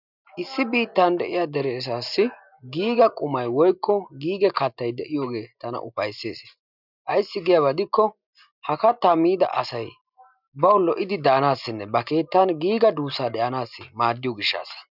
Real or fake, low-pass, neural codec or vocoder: real; 5.4 kHz; none